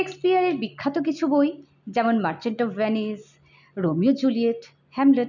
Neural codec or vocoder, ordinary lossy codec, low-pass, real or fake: none; none; 7.2 kHz; real